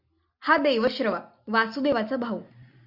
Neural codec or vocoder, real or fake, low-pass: none; real; 5.4 kHz